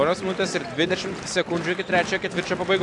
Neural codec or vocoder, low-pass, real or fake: none; 10.8 kHz; real